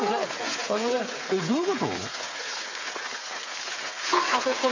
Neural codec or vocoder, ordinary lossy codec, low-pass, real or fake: codec, 16 kHz, 8 kbps, FreqCodec, smaller model; AAC, 32 kbps; 7.2 kHz; fake